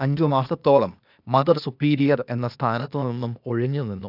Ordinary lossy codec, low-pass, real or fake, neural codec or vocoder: none; 5.4 kHz; fake; codec, 16 kHz, 0.8 kbps, ZipCodec